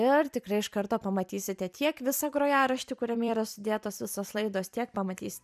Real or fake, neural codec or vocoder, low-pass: fake; vocoder, 44.1 kHz, 128 mel bands every 256 samples, BigVGAN v2; 14.4 kHz